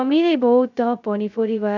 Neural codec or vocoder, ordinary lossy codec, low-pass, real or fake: codec, 16 kHz, 0.2 kbps, FocalCodec; none; 7.2 kHz; fake